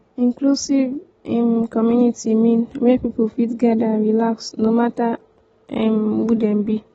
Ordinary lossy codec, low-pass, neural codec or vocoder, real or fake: AAC, 24 kbps; 19.8 kHz; none; real